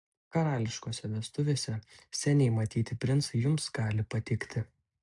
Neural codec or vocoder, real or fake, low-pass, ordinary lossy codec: none; real; 10.8 kHz; AAC, 64 kbps